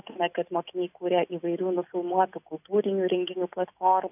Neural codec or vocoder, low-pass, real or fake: none; 3.6 kHz; real